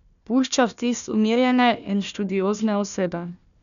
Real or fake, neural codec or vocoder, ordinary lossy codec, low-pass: fake; codec, 16 kHz, 1 kbps, FunCodec, trained on Chinese and English, 50 frames a second; none; 7.2 kHz